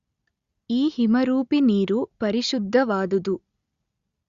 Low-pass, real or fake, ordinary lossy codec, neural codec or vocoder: 7.2 kHz; real; Opus, 64 kbps; none